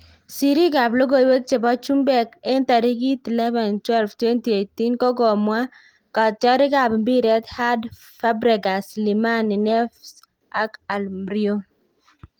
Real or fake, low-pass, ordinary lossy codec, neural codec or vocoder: real; 19.8 kHz; Opus, 24 kbps; none